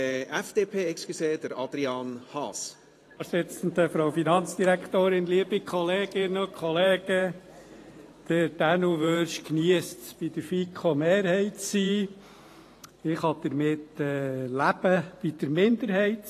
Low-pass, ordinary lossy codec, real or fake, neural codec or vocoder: 14.4 kHz; AAC, 48 kbps; fake; vocoder, 44.1 kHz, 128 mel bands every 512 samples, BigVGAN v2